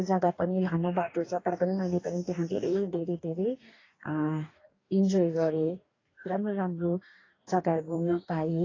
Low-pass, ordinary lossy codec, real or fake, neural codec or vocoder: 7.2 kHz; AAC, 32 kbps; fake; codec, 44.1 kHz, 2.6 kbps, DAC